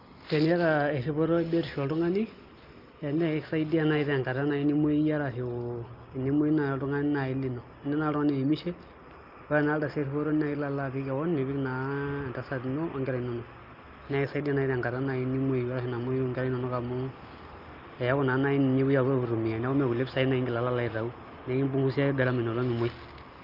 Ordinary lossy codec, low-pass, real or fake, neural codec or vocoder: Opus, 32 kbps; 5.4 kHz; real; none